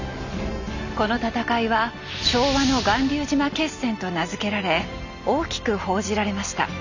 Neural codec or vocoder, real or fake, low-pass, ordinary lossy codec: none; real; 7.2 kHz; none